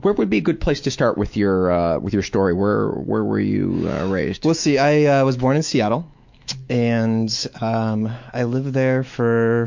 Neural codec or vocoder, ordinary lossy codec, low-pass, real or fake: autoencoder, 48 kHz, 128 numbers a frame, DAC-VAE, trained on Japanese speech; MP3, 48 kbps; 7.2 kHz; fake